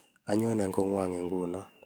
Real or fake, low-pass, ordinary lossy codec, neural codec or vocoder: fake; none; none; codec, 44.1 kHz, 7.8 kbps, DAC